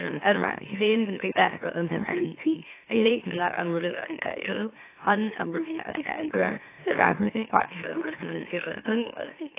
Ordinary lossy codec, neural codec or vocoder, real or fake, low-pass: AAC, 24 kbps; autoencoder, 44.1 kHz, a latent of 192 numbers a frame, MeloTTS; fake; 3.6 kHz